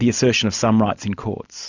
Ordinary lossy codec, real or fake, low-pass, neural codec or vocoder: Opus, 64 kbps; real; 7.2 kHz; none